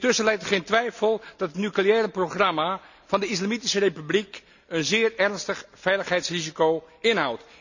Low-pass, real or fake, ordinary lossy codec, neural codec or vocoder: 7.2 kHz; real; none; none